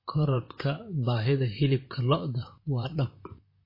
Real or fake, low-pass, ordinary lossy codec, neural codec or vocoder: fake; 5.4 kHz; MP3, 24 kbps; vocoder, 44.1 kHz, 128 mel bands, Pupu-Vocoder